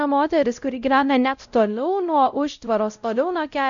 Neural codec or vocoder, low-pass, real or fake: codec, 16 kHz, 0.5 kbps, X-Codec, WavLM features, trained on Multilingual LibriSpeech; 7.2 kHz; fake